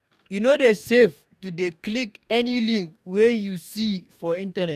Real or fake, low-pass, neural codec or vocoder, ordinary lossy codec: fake; 14.4 kHz; codec, 44.1 kHz, 2.6 kbps, DAC; none